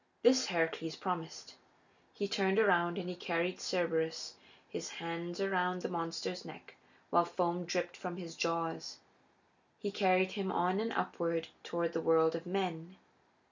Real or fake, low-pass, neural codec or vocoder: real; 7.2 kHz; none